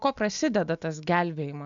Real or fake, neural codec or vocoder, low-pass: real; none; 7.2 kHz